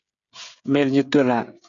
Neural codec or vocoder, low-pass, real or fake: codec, 16 kHz, 16 kbps, FreqCodec, smaller model; 7.2 kHz; fake